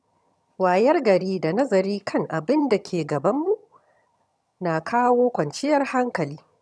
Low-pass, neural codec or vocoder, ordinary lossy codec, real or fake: none; vocoder, 22.05 kHz, 80 mel bands, HiFi-GAN; none; fake